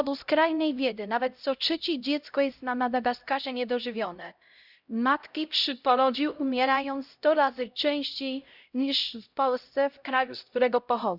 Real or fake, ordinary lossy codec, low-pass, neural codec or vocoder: fake; Opus, 64 kbps; 5.4 kHz; codec, 16 kHz, 0.5 kbps, X-Codec, HuBERT features, trained on LibriSpeech